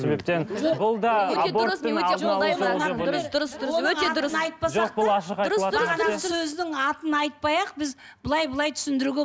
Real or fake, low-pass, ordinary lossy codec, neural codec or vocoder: real; none; none; none